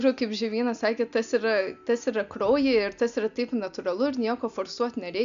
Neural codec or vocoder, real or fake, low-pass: none; real; 7.2 kHz